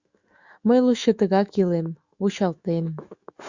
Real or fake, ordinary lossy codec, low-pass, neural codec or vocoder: fake; Opus, 64 kbps; 7.2 kHz; autoencoder, 48 kHz, 128 numbers a frame, DAC-VAE, trained on Japanese speech